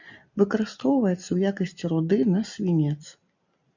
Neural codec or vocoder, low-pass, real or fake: none; 7.2 kHz; real